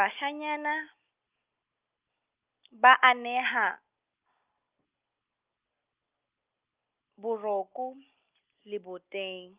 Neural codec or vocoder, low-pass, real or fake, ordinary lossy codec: none; 3.6 kHz; real; Opus, 24 kbps